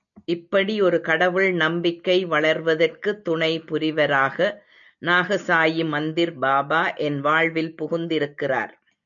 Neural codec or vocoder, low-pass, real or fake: none; 7.2 kHz; real